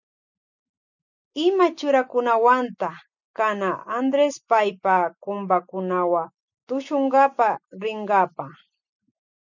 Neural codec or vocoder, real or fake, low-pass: none; real; 7.2 kHz